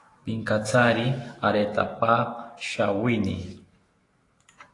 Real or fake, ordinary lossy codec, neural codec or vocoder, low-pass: fake; AAC, 32 kbps; autoencoder, 48 kHz, 128 numbers a frame, DAC-VAE, trained on Japanese speech; 10.8 kHz